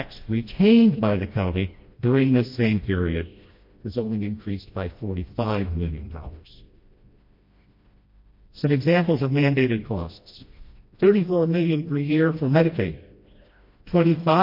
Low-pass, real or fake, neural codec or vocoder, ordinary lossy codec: 5.4 kHz; fake; codec, 16 kHz, 1 kbps, FreqCodec, smaller model; MP3, 32 kbps